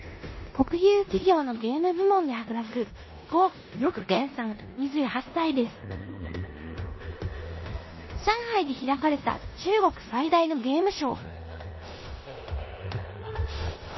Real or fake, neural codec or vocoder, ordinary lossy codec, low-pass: fake; codec, 16 kHz in and 24 kHz out, 0.9 kbps, LongCat-Audio-Codec, four codebook decoder; MP3, 24 kbps; 7.2 kHz